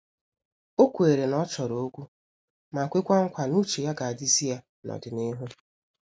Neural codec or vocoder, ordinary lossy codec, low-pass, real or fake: none; none; none; real